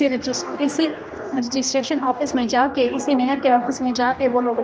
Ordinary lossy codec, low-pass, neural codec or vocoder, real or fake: none; none; codec, 16 kHz, 1 kbps, X-Codec, HuBERT features, trained on general audio; fake